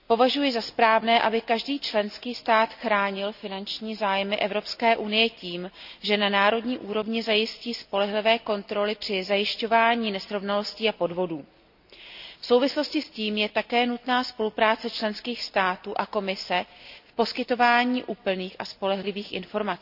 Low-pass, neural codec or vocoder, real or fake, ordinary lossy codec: 5.4 kHz; none; real; none